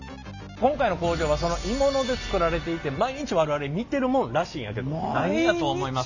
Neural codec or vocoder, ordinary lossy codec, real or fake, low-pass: none; none; real; 7.2 kHz